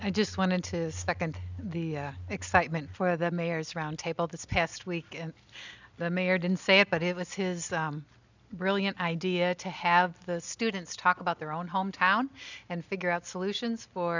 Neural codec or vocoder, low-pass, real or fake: none; 7.2 kHz; real